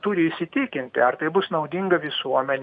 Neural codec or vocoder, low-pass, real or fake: none; 9.9 kHz; real